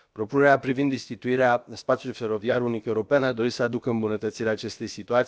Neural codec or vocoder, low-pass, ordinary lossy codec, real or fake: codec, 16 kHz, about 1 kbps, DyCAST, with the encoder's durations; none; none; fake